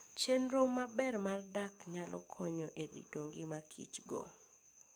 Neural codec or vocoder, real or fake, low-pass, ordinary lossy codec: codec, 44.1 kHz, 7.8 kbps, DAC; fake; none; none